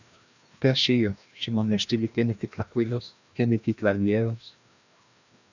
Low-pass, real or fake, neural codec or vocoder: 7.2 kHz; fake; codec, 16 kHz, 1 kbps, FreqCodec, larger model